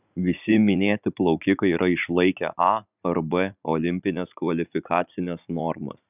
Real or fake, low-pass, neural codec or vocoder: fake; 3.6 kHz; vocoder, 44.1 kHz, 80 mel bands, Vocos